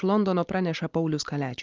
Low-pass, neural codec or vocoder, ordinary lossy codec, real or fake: 7.2 kHz; none; Opus, 32 kbps; real